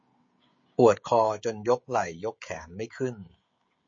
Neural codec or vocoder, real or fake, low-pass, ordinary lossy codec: codec, 16 kHz, 16 kbps, FreqCodec, smaller model; fake; 7.2 kHz; MP3, 32 kbps